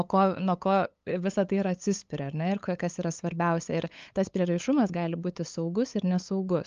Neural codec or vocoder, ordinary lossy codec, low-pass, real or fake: codec, 16 kHz, 8 kbps, FunCodec, trained on LibriTTS, 25 frames a second; Opus, 32 kbps; 7.2 kHz; fake